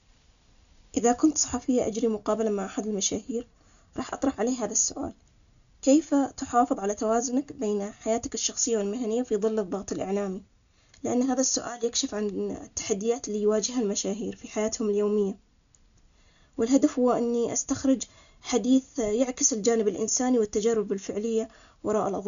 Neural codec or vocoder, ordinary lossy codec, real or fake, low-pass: none; none; real; 7.2 kHz